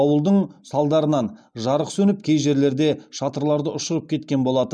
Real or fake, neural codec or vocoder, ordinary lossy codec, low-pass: real; none; none; none